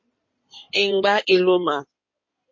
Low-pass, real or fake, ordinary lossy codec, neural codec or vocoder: 7.2 kHz; fake; MP3, 32 kbps; codec, 16 kHz in and 24 kHz out, 2.2 kbps, FireRedTTS-2 codec